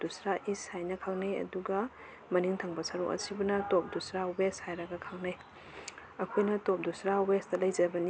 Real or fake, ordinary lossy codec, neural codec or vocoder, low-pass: real; none; none; none